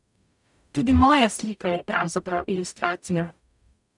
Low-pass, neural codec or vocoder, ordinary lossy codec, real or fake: 10.8 kHz; codec, 44.1 kHz, 0.9 kbps, DAC; none; fake